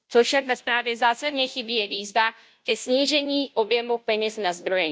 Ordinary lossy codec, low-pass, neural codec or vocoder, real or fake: none; none; codec, 16 kHz, 0.5 kbps, FunCodec, trained on Chinese and English, 25 frames a second; fake